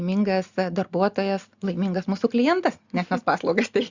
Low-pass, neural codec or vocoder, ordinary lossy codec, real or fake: 7.2 kHz; none; Opus, 64 kbps; real